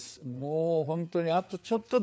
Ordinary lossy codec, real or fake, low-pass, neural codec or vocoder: none; fake; none; codec, 16 kHz, 4 kbps, FreqCodec, larger model